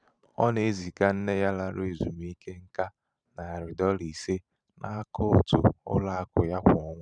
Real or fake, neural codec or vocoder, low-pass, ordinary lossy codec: fake; vocoder, 44.1 kHz, 128 mel bands every 512 samples, BigVGAN v2; 9.9 kHz; none